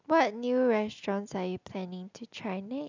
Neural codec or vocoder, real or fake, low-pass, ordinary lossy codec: none; real; 7.2 kHz; none